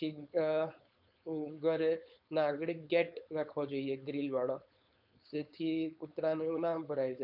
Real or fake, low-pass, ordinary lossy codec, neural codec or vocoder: fake; 5.4 kHz; MP3, 48 kbps; codec, 16 kHz, 4.8 kbps, FACodec